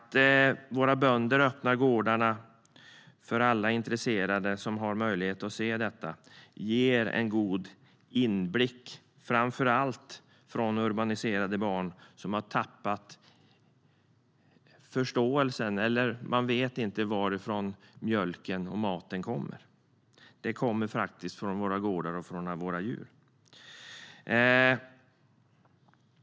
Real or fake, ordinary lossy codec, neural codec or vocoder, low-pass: real; none; none; none